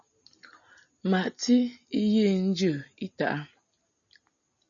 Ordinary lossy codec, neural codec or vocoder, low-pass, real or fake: MP3, 96 kbps; none; 7.2 kHz; real